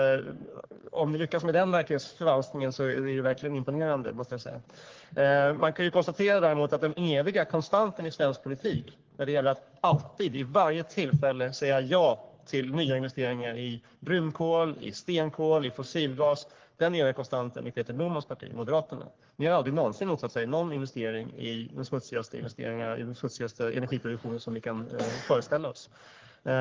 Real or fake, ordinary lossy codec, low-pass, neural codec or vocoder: fake; Opus, 16 kbps; 7.2 kHz; codec, 44.1 kHz, 3.4 kbps, Pupu-Codec